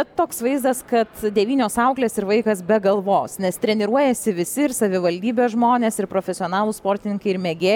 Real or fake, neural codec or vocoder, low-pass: real; none; 19.8 kHz